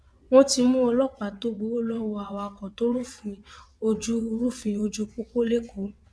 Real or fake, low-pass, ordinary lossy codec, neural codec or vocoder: fake; none; none; vocoder, 22.05 kHz, 80 mel bands, WaveNeXt